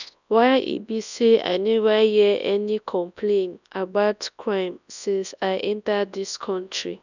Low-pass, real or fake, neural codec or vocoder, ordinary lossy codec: 7.2 kHz; fake; codec, 24 kHz, 0.9 kbps, WavTokenizer, large speech release; none